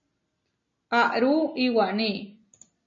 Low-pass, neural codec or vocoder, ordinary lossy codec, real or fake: 7.2 kHz; none; MP3, 32 kbps; real